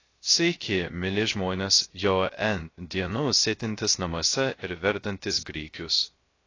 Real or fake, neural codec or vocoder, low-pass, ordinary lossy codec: fake; codec, 16 kHz, 0.3 kbps, FocalCodec; 7.2 kHz; AAC, 32 kbps